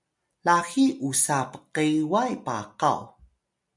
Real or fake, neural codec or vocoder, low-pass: real; none; 10.8 kHz